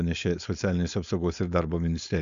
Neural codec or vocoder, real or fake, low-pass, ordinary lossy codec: codec, 16 kHz, 4.8 kbps, FACodec; fake; 7.2 kHz; MP3, 64 kbps